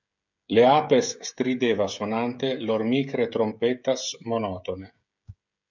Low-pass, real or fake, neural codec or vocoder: 7.2 kHz; fake; codec, 16 kHz, 16 kbps, FreqCodec, smaller model